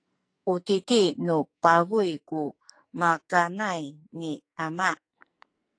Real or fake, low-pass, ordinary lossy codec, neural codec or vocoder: fake; 9.9 kHz; AAC, 48 kbps; codec, 44.1 kHz, 2.6 kbps, SNAC